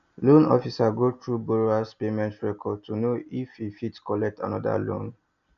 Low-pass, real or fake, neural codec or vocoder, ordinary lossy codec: 7.2 kHz; real; none; none